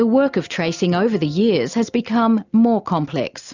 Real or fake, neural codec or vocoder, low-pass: real; none; 7.2 kHz